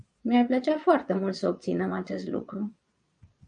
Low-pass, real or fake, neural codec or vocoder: 9.9 kHz; fake; vocoder, 22.05 kHz, 80 mel bands, Vocos